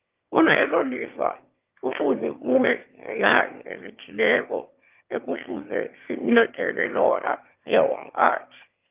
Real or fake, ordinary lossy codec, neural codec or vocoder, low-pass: fake; Opus, 32 kbps; autoencoder, 22.05 kHz, a latent of 192 numbers a frame, VITS, trained on one speaker; 3.6 kHz